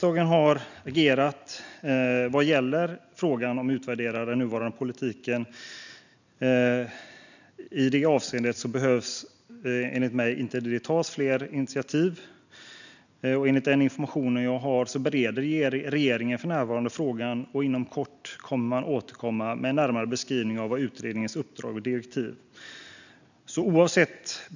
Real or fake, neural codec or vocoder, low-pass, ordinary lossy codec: real; none; 7.2 kHz; none